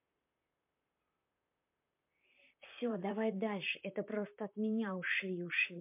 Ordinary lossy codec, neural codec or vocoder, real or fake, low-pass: MP3, 32 kbps; vocoder, 44.1 kHz, 128 mel bands, Pupu-Vocoder; fake; 3.6 kHz